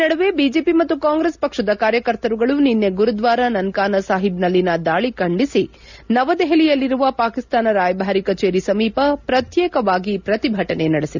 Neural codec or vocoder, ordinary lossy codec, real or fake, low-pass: none; none; real; 7.2 kHz